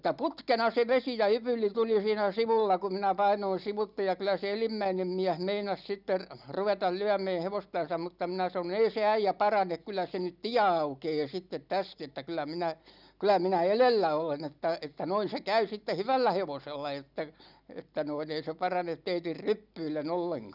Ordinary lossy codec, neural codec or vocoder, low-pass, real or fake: none; none; 5.4 kHz; real